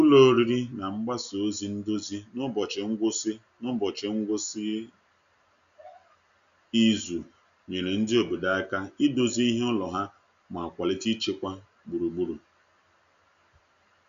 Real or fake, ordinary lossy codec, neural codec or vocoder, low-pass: real; none; none; 7.2 kHz